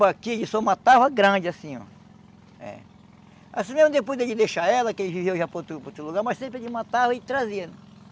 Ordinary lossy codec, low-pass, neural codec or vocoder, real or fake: none; none; none; real